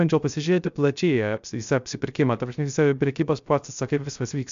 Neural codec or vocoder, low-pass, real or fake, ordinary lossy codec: codec, 16 kHz, 0.3 kbps, FocalCodec; 7.2 kHz; fake; MP3, 64 kbps